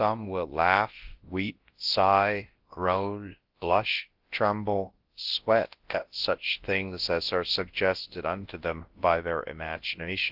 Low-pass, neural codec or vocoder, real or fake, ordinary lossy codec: 5.4 kHz; codec, 24 kHz, 0.9 kbps, WavTokenizer, large speech release; fake; Opus, 16 kbps